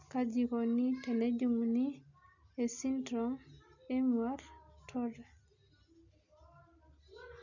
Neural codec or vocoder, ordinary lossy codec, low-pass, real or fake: none; none; 7.2 kHz; real